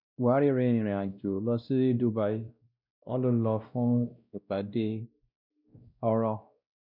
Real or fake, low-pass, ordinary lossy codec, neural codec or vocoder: fake; 5.4 kHz; none; codec, 16 kHz, 1 kbps, X-Codec, WavLM features, trained on Multilingual LibriSpeech